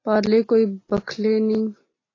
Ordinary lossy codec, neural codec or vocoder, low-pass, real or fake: AAC, 32 kbps; none; 7.2 kHz; real